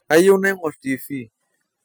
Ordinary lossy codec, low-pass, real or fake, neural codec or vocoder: none; none; real; none